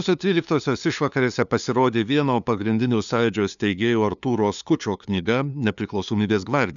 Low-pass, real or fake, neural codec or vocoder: 7.2 kHz; fake; codec, 16 kHz, 2 kbps, FunCodec, trained on LibriTTS, 25 frames a second